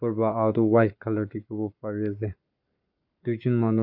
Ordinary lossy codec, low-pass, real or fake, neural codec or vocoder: none; 5.4 kHz; fake; codec, 44.1 kHz, 7.8 kbps, Pupu-Codec